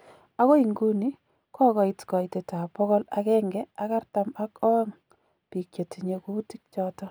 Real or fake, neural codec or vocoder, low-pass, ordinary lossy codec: real; none; none; none